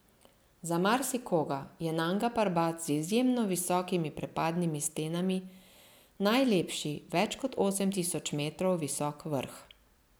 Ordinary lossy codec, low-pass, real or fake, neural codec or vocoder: none; none; real; none